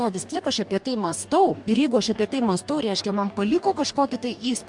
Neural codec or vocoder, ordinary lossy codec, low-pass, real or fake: codec, 44.1 kHz, 2.6 kbps, DAC; MP3, 64 kbps; 10.8 kHz; fake